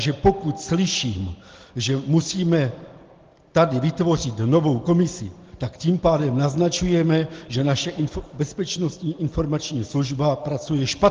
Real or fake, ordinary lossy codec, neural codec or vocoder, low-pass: real; Opus, 16 kbps; none; 7.2 kHz